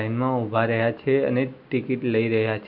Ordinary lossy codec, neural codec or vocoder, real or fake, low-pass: none; none; real; 5.4 kHz